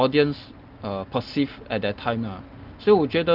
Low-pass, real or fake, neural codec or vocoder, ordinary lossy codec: 5.4 kHz; real; none; Opus, 24 kbps